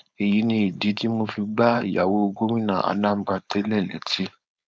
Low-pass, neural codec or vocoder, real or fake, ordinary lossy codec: none; codec, 16 kHz, 4.8 kbps, FACodec; fake; none